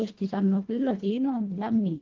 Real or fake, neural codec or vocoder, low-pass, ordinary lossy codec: fake; codec, 24 kHz, 1.5 kbps, HILCodec; 7.2 kHz; Opus, 16 kbps